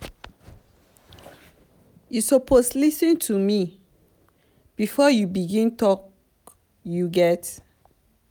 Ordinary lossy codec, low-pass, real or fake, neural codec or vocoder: none; none; real; none